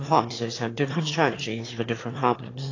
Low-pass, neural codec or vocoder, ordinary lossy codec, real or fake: 7.2 kHz; autoencoder, 22.05 kHz, a latent of 192 numbers a frame, VITS, trained on one speaker; AAC, 32 kbps; fake